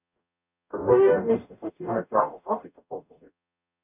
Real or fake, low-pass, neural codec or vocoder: fake; 3.6 kHz; codec, 44.1 kHz, 0.9 kbps, DAC